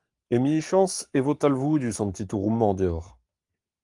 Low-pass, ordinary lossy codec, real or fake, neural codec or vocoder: 10.8 kHz; Opus, 32 kbps; fake; codec, 44.1 kHz, 7.8 kbps, Pupu-Codec